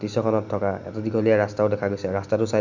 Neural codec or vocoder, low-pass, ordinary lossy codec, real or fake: none; 7.2 kHz; none; real